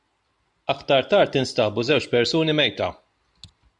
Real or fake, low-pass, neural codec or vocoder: real; 10.8 kHz; none